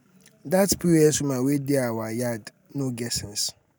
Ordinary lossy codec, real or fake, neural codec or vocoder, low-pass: none; real; none; none